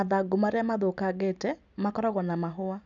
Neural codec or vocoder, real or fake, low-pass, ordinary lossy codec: none; real; 7.2 kHz; none